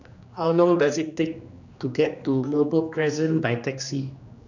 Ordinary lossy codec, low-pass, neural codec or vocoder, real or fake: none; 7.2 kHz; codec, 16 kHz, 2 kbps, X-Codec, HuBERT features, trained on general audio; fake